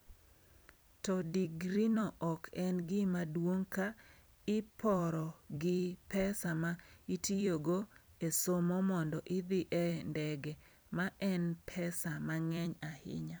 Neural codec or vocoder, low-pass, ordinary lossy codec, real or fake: vocoder, 44.1 kHz, 128 mel bands every 256 samples, BigVGAN v2; none; none; fake